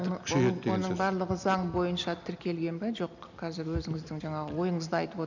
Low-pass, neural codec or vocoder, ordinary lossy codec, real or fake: 7.2 kHz; none; none; real